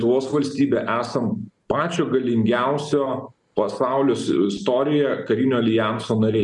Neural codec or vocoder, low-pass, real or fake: none; 10.8 kHz; real